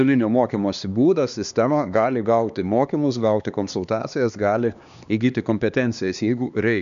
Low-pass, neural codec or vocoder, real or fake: 7.2 kHz; codec, 16 kHz, 2 kbps, X-Codec, HuBERT features, trained on LibriSpeech; fake